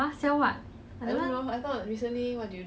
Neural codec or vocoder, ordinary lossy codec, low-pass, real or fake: none; none; none; real